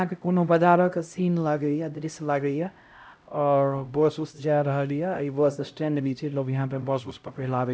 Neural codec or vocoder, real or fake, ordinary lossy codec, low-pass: codec, 16 kHz, 0.5 kbps, X-Codec, HuBERT features, trained on LibriSpeech; fake; none; none